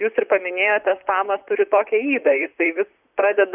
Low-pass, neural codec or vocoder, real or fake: 3.6 kHz; vocoder, 44.1 kHz, 128 mel bands, Pupu-Vocoder; fake